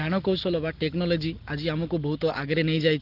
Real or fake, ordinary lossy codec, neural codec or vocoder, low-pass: real; Opus, 16 kbps; none; 5.4 kHz